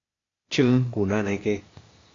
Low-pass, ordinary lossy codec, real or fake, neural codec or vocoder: 7.2 kHz; AAC, 32 kbps; fake; codec, 16 kHz, 0.8 kbps, ZipCodec